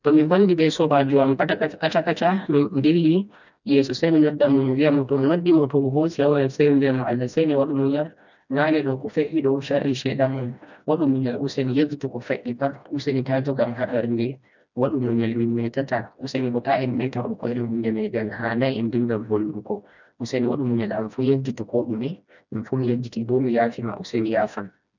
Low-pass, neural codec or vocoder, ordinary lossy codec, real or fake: 7.2 kHz; codec, 16 kHz, 1 kbps, FreqCodec, smaller model; none; fake